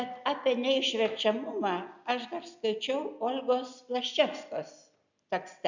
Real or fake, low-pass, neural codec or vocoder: real; 7.2 kHz; none